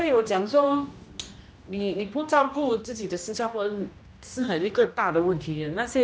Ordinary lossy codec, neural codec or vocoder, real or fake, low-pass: none; codec, 16 kHz, 1 kbps, X-Codec, HuBERT features, trained on general audio; fake; none